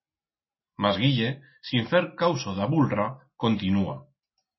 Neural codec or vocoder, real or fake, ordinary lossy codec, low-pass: none; real; MP3, 24 kbps; 7.2 kHz